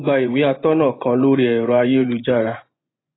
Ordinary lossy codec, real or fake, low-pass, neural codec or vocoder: AAC, 16 kbps; fake; 7.2 kHz; vocoder, 24 kHz, 100 mel bands, Vocos